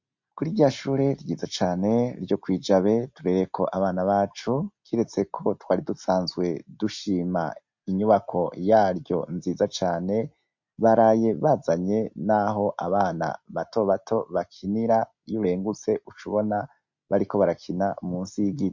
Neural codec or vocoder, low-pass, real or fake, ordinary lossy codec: none; 7.2 kHz; real; MP3, 48 kbps